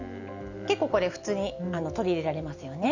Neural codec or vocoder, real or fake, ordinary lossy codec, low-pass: none; real; none; 7.2 kHz